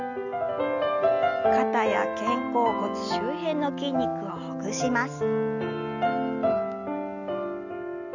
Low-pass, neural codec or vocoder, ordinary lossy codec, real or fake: 7.2 kHz; none; none; real